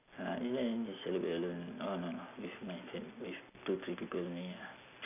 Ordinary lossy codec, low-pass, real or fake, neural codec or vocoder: none; 3.6 kHz; real; none